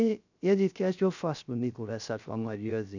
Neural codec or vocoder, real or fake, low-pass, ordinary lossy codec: codec, 16 kHz, 0.3 kbps, FocalCodec; fake; 7.2 kHz; none